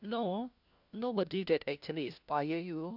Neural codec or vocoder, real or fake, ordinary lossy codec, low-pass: codec, 16 kHz, 1 kbps, FunCodec, trained on LibriTTS, 50 frames a second; fake; none; 5.4 kHz